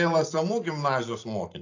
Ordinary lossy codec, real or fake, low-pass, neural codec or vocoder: AAC, 48 kbps; real; 7.2 kHz; none